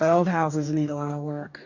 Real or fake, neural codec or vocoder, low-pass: fake; codec, 44.1 kHz, 2.6 kbps, DAC; 7.2 kHz